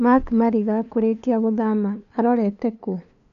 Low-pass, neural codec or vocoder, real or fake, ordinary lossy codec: 7.2 kHz; codec, 16 kHz, 2 kbps, FunCodec, trained on LibriTTS, 25 frames a second; fake; none